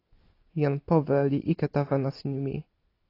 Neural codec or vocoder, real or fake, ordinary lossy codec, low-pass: none; real; AAC, 32 kbps; 5.4 kHz